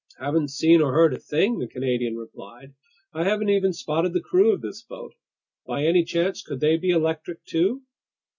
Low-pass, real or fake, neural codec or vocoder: 7.2 kHz; real; none